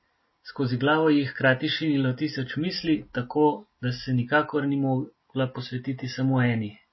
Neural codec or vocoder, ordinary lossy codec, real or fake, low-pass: none; MP3, 24 kbps; real; 7.2 kHz